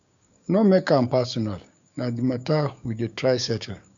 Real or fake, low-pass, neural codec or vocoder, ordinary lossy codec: fake; 7.2 kHz; codec, 16 kHz, 6 kbps, DAC; none